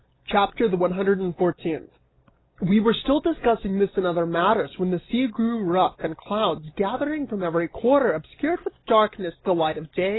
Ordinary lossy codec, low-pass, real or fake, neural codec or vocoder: AAC, 16 kbps; 7.2 kHz; real; none